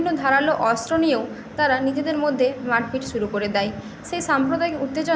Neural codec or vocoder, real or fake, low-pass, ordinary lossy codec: none; real; none; none